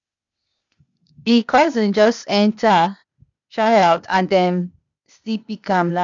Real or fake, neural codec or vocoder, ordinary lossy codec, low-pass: fake; codec, 16 kHz, 0.8 kbps, ZipCodec; MP3, 96 kbps; 7.2 kHz